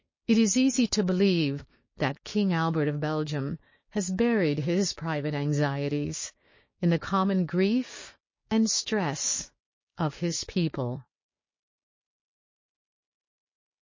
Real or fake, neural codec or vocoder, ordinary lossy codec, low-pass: fake; codec, 16 kHz, 6 kbps, DAC; MP3, 32 kbps; 7.2 kHz